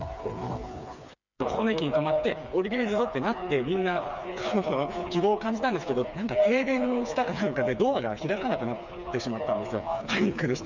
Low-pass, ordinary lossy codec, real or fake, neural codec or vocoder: 7.2 kHz; none; fake; codec, 16 kHz, 4 kbps, FreqCodec, smaller model